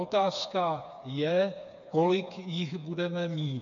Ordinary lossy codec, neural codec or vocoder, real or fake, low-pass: AAC, 64 kbps; codec, 16 kHz, 4 kbps, FreqCodec, smaller model; fake; 7.2 kHz